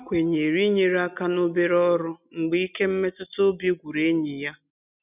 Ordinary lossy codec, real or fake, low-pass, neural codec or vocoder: none; real; 3.6 kHz; none